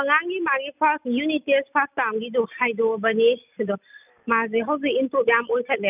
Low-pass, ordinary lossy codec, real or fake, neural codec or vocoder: 3.6 kHz; none; real; none